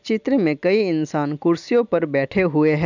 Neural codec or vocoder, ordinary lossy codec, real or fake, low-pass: none; none; real; 7.2 kHz